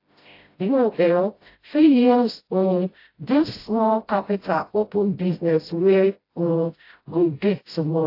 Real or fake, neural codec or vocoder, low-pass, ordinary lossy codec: fake; codec, 16 kHz, 0.5 kbps, FreqCodec, smaller model; 5.4 kHz; AAC, 32 kbps